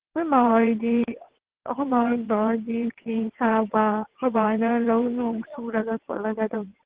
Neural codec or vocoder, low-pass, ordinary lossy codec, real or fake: vocoder, 22.05 kHz, 80 mel bands, WaveNeXt; 3.6 kHz; Opus, 16 kbps; fake